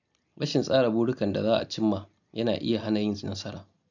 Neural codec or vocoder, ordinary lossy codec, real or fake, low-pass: none; none; real; 7.2 kHz